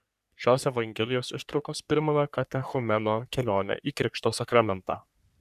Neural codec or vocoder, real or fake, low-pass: codec, 44.1 kHz, 3.4 kbps, Pupu-Codec; fake; 14.4 kHz